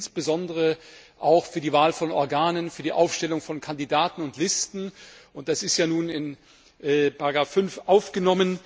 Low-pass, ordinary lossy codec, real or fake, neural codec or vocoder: none; none; real; none